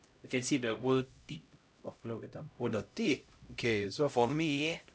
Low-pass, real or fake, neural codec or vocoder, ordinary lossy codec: none; fake; codec, 16 kHz, 0.5 kbps, X-Codec, HuBERT features, trained on LibriSpeech; none